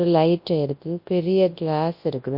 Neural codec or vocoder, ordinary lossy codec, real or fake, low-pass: codec, 24 kHz, 0.9 kbps, WavTokenizer, large speech release; MP3, 32 kbps; fake; 5.4 kHz